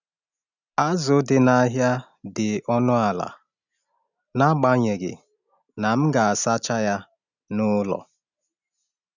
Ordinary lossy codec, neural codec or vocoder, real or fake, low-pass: none; none; real; 7.2 kHz